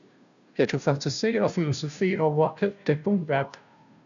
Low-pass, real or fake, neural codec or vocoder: 7.2 kHz; fake; codec, 16 kHz, 0.5 kbps, FunCodec, trained on Chinese and English, 25 frames a second